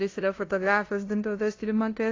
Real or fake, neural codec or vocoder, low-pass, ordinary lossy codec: fake; codec, 16 kHz, 0.5 kbps, FunCodec, trained on LibriTTS, 25 frames a second; 7.2 kHz; AAC, 32 kbps